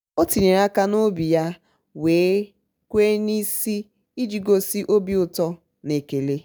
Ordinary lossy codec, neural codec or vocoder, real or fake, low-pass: none; none; real; none